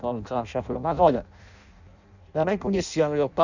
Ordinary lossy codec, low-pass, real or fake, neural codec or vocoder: none; 7.2 kHz; fake; codec, 16 kHz in and 24 kHz out, 0.6 kbps, FireRedTTS-2 codec